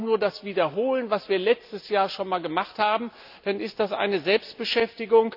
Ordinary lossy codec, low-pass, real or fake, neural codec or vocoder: none; 5.4 kHz; real; none